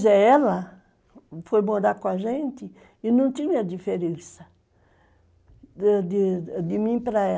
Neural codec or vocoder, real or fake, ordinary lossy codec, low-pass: none; real; none; none